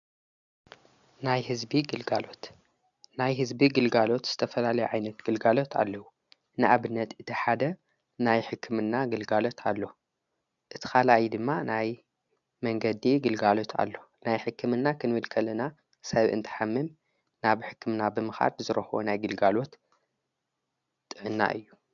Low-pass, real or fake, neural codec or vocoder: 7.2 kHz; real; none